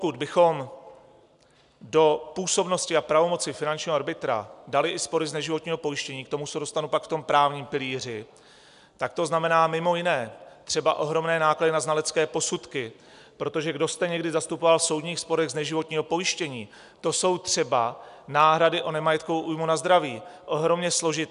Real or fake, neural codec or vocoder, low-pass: real; none; 10.8 kHz